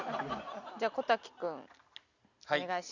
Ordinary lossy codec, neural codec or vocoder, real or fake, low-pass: none; none; real; 7.2 kHz